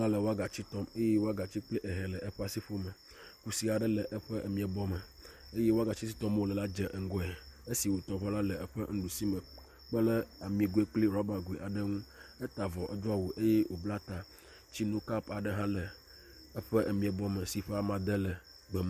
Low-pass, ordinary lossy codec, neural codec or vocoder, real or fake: 14.4 kHz; MP3, 96 kbps; none; real